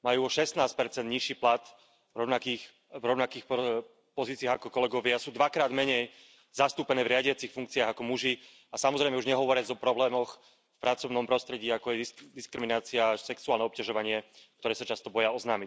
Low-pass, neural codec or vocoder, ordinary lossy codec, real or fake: none; none; none; real